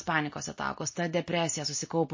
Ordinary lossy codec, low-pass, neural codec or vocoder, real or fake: MP3, 32 kbps; 7.2 kHz; none; real